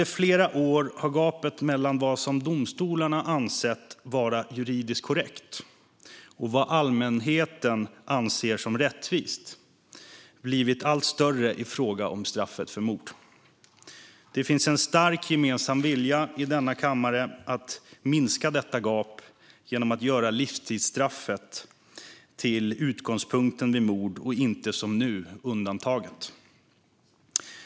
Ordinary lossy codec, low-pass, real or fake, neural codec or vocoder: none; none; real; none